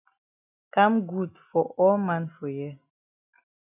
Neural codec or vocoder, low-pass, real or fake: none; 3.6 kHz; real